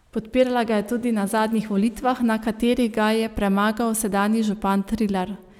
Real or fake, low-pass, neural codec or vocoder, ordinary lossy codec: real; 19.8 kHz; none; none